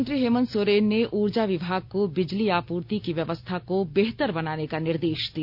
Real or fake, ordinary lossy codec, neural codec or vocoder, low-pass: real; none; none; 5.4 kHz